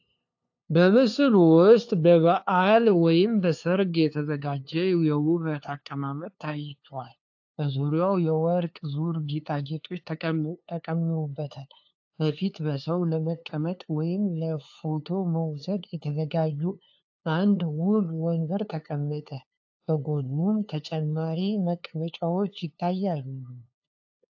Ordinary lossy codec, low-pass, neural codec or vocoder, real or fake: AAC, 48 kbps; 7.2 kHz; codec, 16 kHz, 2 kbps, FunCodec, trained on LibriTTS, 25 frames a second; fake